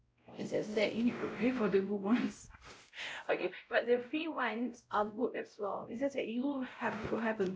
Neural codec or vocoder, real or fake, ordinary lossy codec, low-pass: codec, 16 kHz, 0.5 kbps, X-Codec, WavLM features, trained on Multilingual LibriSpeech; fake; none; none